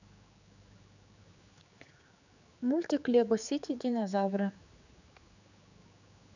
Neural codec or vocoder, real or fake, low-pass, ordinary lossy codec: codec, 16 kHz, 4 kbps, X-Codec, HuBERT features, trained on general audio; fake; 7.2 kHz; none